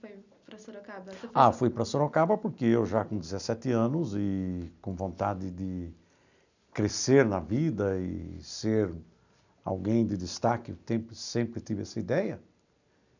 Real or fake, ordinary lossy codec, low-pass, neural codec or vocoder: real; none; 7.2 kHz; none